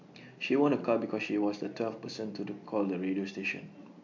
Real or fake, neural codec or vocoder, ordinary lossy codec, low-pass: real; none; MP3, 48 kbps; 7.2 kHz